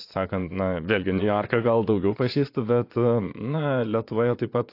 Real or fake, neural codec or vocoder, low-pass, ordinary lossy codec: fake; vocoder, 22.05 kHz, 80 mel bands, Vocos; 5.4 kHz; AAC, 32 kbps